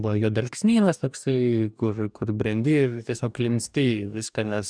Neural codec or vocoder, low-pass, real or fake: codec, 44.1 kHz, 2.6 kbps, DAC; 9.9 kHz; fake